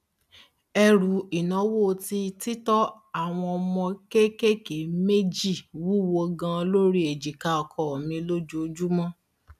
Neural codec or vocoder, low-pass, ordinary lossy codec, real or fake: none; 14.4 kHz; none; real